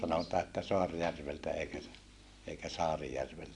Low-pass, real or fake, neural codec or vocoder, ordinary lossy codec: 10.8 kHz; real; none; none